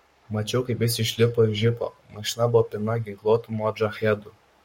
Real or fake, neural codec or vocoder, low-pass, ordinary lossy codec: fake; codec, 44.1 kHz, 7.8 kbps, Pupu-Codec; 19.8 kHz; MP3, 64 kbps